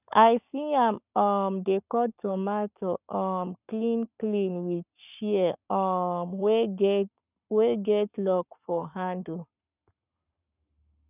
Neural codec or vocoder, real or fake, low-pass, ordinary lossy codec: codec, 44.1 kHz, 7.8 kbps, Pupu-Codec; fake; 3.6 kHz; none